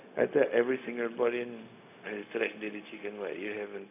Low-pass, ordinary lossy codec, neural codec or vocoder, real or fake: 3.6 kHz; AAC, 32 kbps; codec, 16 kHz, 0.4 kbps, LongCat-Audio-Codec; fake